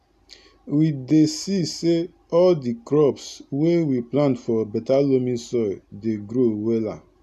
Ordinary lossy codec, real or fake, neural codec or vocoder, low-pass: none; real; none; 14.4 kHz